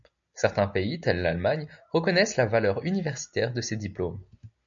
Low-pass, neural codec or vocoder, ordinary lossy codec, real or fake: 7.2 kHz; none; MP3, 64 kbps; real